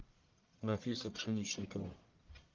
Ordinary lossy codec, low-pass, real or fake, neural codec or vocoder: Opus, 24 kbps; 7.2 kHz; fake; codec, 44.1 kHz, 1.7 kbps, Pupu-Codec